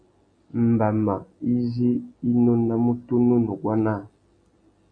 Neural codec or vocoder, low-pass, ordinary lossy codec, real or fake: none; 9.9 kHz; MP3, 64 kbps; real